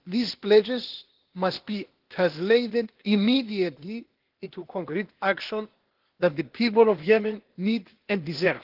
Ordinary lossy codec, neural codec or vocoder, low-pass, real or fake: Opus, 16 kbps; codec, 16 kHz, 0.8 kbps, ZipCodec; 5.4 kHz; fake